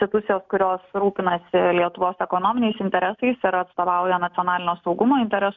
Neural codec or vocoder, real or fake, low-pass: none; real; 7.2 kHz